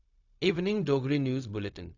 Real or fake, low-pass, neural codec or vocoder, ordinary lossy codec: fake; 7.2 kHz; codec, 16 kHz, 0.4 kbps, LongCat-Audio-Codec; none